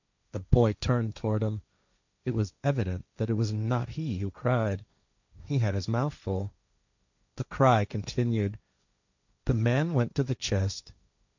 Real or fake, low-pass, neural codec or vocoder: fake; 7.2 kHz; codec, 16 kHz, 1.1 kbps, Voila-Tokenizer